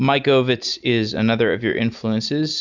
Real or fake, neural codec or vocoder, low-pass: real; none; 7.2 kHz